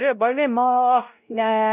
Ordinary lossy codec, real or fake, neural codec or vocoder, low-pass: none; fake; codec, 16 kHz, 0.5 kbps, X-Codec, WavLM features, trained on Multilingual LibriSpeech; 3.6 kHz